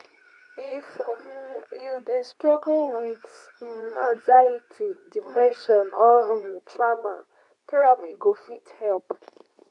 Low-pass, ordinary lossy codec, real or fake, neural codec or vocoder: 10.8 kHz; none; fake; codec, 24 kHz, 0.9 kbps, WavTokenizer, medium speech release version 2